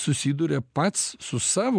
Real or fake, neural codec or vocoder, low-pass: real; none; 9.9 kHz